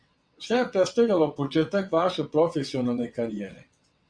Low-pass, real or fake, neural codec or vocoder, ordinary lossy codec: 9.9 kHz; fake; vocoder, 22.05 kHz, 80 mel bands, WaveNeXt; Opus, 64 kbps